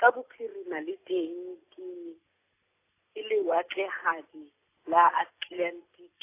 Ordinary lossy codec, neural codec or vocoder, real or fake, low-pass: AAC, 24 kbps; none; real; 3.6 kHz